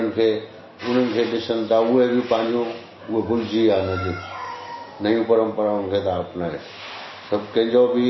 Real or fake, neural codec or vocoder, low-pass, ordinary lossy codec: real; none; 7.2 kHz; MP3, 24 kbps